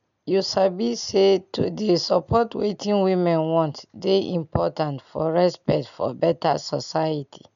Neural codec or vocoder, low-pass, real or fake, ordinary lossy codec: none; 7.2 kHz; real; none